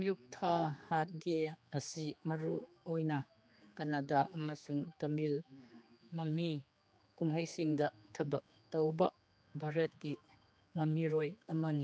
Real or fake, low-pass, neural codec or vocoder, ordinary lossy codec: fake; none; codec, 16 kHz, 2 kbps, X-Codec, HuBERT features, trained on general audio; none